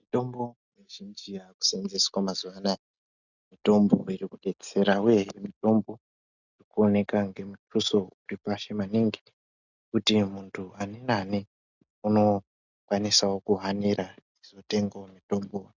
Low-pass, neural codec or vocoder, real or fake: 7.2 kHz; none; real